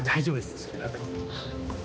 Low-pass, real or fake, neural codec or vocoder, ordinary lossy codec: none; fake; codec, 16 kHz, 2 kbps, X-Codec, HuBERT features, trained on balanced general audio; none